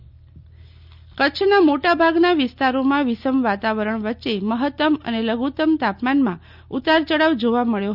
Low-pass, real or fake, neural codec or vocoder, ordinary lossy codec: 5.4 kHz; real; none; none